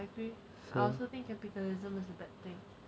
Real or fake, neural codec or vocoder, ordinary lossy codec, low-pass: real; none; none; none